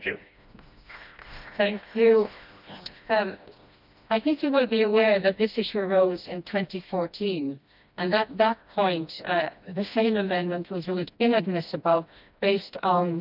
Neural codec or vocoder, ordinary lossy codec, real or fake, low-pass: codec, 16 kHz, 1 kbps, FreqCodec, smaller model; none; fake; 5.4 kHz